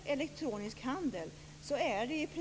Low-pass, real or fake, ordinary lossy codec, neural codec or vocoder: none; real; none; none